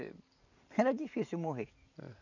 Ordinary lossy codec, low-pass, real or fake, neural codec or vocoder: none; 7.2 kHz; fake; vocoder, 44.1 kHz, 128 mel bands every 256 samples, BigVGAN v2